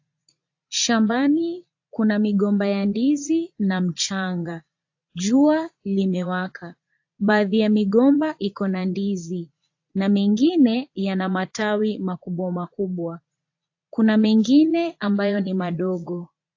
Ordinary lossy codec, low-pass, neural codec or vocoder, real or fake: AAC, 48 kbps; 7.2 kHz; vocoder, 22.05 kHz, 80 mel bands, Vocos; fake